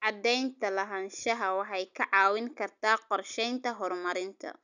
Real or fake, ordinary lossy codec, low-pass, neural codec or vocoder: real; none; 7.2 kHz; none